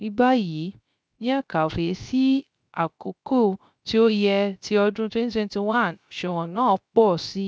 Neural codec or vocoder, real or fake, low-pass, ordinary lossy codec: codec, 16 kHz, 0.3 kbps, FocalCodec; fake; none; none